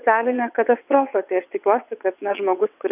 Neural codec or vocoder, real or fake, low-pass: vocoder, 22.05 kHz, 80 mel bands, WaveNeXt; fake; 3.6 kHz